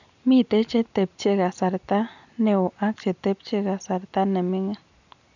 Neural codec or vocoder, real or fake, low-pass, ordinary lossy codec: none; real; 7.2 kHz; none